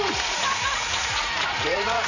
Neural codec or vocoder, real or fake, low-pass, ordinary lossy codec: vocoder, 44.1 kHz, 80 mel bands, Vocos; fake; 7.2 kHz; none